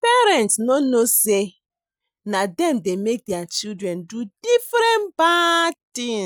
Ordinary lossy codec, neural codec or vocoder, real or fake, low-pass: none; none; real; none